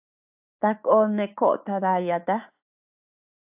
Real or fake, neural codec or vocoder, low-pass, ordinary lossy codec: fake; codec, 16 kHz, 6 kbps, DAC; 3.6 kHz; AAC, 32 kbps